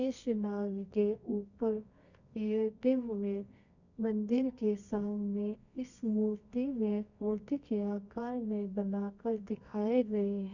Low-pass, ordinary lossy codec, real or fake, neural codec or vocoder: 7.2 kHz; none; fake; codec, 24 kHz, 0.9 kbps, WavTokenizer, medium music audio release